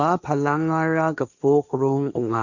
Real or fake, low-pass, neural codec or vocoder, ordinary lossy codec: fake; 7.2 kHz; codec, 16 kHz, 1.1 kbps, Voila-Tokenizer; none